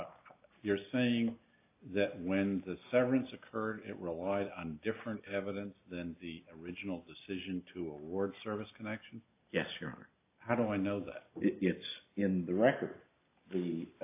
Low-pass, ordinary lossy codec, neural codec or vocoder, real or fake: 3.6 kHz; AAC, 24 kbps; none; real